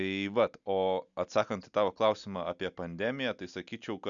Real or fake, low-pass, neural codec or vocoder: real; 7.2 kHz; none